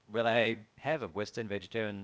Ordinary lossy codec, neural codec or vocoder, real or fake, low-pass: none; codec, 16 kHz, 0.8 kbps, ZipCodec; fake; none